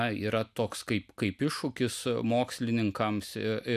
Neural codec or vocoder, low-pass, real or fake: none; 14.4 kHz; real